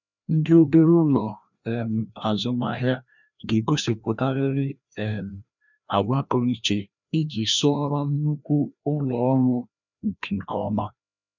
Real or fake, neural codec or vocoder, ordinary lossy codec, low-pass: fake; codec, 16 kHz, 1 kbps, FreqCodec, larger model; none; 7.2 kHz